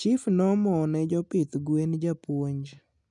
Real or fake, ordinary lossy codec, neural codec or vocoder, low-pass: real; none; none; 10.8 kHz